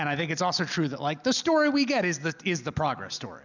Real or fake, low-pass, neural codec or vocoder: real; 7.2 kHz; none